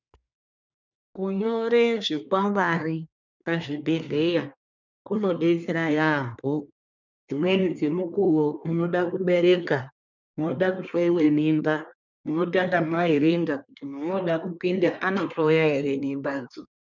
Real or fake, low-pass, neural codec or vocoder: fake; 7.2 kHz; codec, 24 kHz, 1 kbps, SNAC